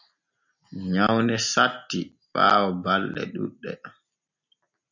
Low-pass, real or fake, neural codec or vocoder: 7.2 kHz; real; none